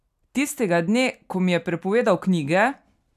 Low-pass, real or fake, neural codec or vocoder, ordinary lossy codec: 14.4 kHz; real; none; none